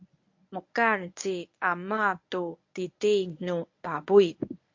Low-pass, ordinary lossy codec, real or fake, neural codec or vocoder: 7.2 kHz; MP3, 48 kbps; fake; codec, 24 kHz, 0.9 kbps, WavTokenizer, medium speech release version 1